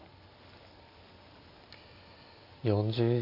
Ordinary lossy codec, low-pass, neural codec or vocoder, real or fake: AAC, 32 kbps; 5.4 kHz; none; real